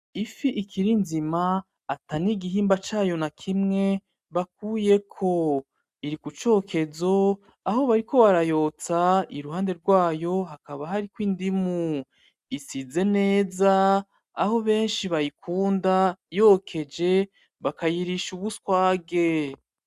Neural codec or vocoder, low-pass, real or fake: none; 14.4 kHz; real